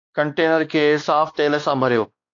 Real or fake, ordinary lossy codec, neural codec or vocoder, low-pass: fake; AAC, 48 kbps; codec, 16 kHz, 2 kbps, X-Codec, WavLM features, trained on Multilingual LibriSpeech; 7.2 kHz